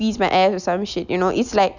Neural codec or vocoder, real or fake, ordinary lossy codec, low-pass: none; real; none; 7.2 kHz